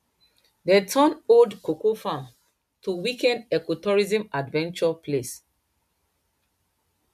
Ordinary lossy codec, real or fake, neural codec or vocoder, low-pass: MP3, 96 kbps; real; none; 14.4 kHz